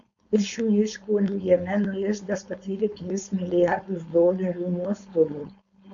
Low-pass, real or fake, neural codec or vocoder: 7.2 kHz; fake; codec, 16 kHz, 4.8 kbps, FACodec